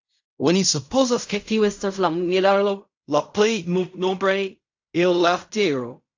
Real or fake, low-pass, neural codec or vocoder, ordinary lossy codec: fake; 7.2 kHz; codec, 16 kHz in and 24 kHz out, 0.4 kbps, LongCat-Audio-Codec, fine tuned four codebook decoder; AAC, 48 kbps